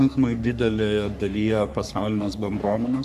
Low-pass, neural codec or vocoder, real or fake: 14.4 kHz; codec, 44.1 kHz, 3.4 kbps, Pupu-Codec; fake